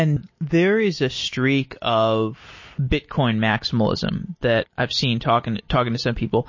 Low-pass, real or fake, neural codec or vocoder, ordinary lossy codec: 7.2 kHz; real; none; MP3, 32 kbps